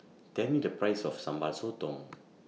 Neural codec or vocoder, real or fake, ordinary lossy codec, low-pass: none; real; none; none